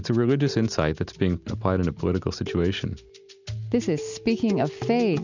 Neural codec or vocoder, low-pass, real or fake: none; 7.2 kHz; real